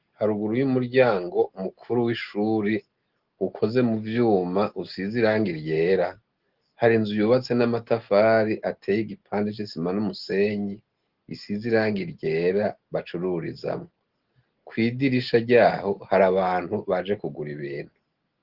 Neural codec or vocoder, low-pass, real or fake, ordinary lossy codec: none; 5.4 kHz; real; Opus, 16 kbps